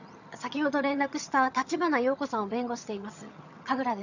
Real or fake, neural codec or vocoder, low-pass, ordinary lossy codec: fake; vocoder, 22.05 kHz, 80 mel bands, HiFi-GAN; 7.2 kHz; none